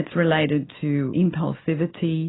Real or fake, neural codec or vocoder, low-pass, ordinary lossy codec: real; none; 7.2 kHz; AAC, 16 kbps